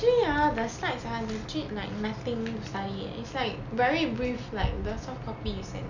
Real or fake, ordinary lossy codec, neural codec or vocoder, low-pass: real; none; none; 7.2 kHz